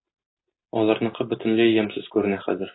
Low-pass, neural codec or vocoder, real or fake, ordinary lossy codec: 7.2 kHz; none; real; AAC, 16 kbps